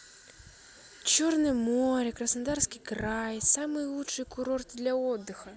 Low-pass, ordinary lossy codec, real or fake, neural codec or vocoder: none; none; real; none